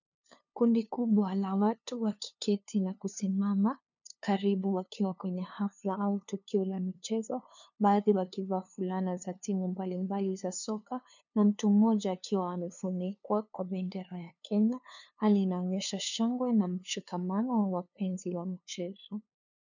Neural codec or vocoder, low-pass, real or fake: codec, 16 kHz, 2 kbps, FunCodec, trained on LibriTTS, 25 frames a second; 7.2 kHz; fake